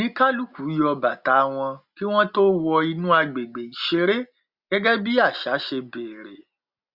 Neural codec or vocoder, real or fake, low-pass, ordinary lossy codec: none; real; 5.4 kHz; Opus, 64 kbps